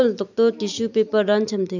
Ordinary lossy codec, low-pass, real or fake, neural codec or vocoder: none; 7.2 kHz; real; none